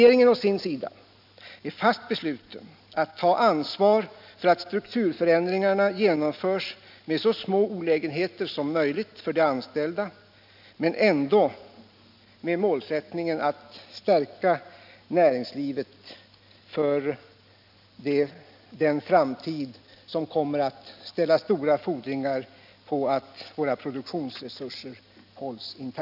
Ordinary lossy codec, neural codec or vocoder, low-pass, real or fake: AAC, 48 kbps; none; 5.4 kHz; real